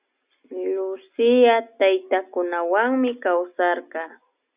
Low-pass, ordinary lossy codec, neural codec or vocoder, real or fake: 3.6 kHz; Opus, 64 kbps; none; real